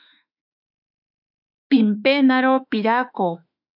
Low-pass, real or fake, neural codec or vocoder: 5.4 kHz; fake; autoencoder, 48 kHz, 32 numbers a frame, DAC-VAE, trained on Japanese speech